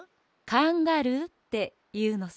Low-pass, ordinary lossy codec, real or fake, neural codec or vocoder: none; none; real; none